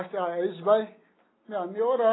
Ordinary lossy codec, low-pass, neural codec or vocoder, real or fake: AAC, 16 kbps; 7.2 kHz; none; real